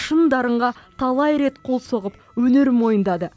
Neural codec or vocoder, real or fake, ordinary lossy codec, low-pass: none; real; none; none